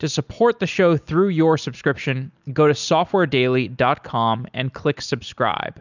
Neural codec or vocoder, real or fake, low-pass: none; real; 7.2 kHz